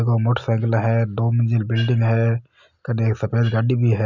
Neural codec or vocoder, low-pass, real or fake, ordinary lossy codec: none; 7.2 kHz; real; none